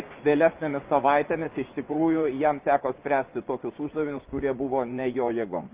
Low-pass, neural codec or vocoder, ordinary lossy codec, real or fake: 3.6 kHz; vocoder, 24 kHz, 100 mel bands, Vocos; Opus, 32 kbps; fake